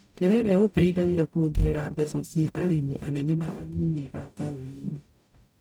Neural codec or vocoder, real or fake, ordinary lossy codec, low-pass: codec, 44.1 kHz, 0.9 kbps, DAC; fake; none; none